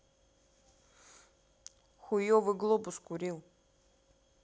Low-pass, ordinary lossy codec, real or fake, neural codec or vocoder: none; none; real; none